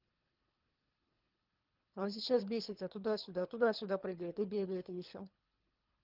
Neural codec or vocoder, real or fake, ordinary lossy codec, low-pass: codec, 24 kHz, 3 kbps, HILCodec; fake; Opus, 32 kbps; 5.4 kHz